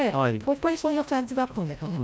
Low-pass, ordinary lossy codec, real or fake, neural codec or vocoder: none; none; fake; codec, 16 kHz, 0.5 kbps, FreqCodec, larger model